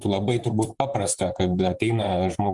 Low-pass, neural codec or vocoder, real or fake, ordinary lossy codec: 10.8 kHz; vocoder, 44.1 kHz, 128 mel bands, Pupu-Vocoder; fake; Opus, 32 kbps